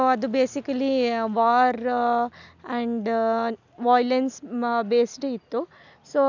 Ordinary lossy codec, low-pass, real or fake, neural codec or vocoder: none; 7.2 kHz; real; none